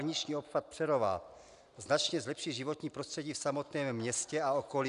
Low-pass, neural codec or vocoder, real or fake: 10.8 kHz; none; real